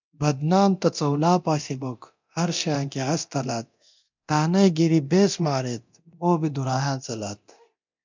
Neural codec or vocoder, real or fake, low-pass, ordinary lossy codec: codec, 24 kHz, 0.9 kbps, DualCodec; fake; 7.2 kHz; MP3, 64 kbps